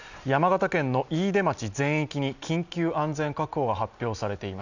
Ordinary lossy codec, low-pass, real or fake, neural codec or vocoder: none; 7.2 kHz; real; none